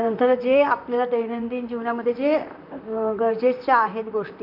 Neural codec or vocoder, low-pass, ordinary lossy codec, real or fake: vocoder, 44.1 kHz, 128 mel bands, Pupu-Vocoder; 5.4 kHz; none; fake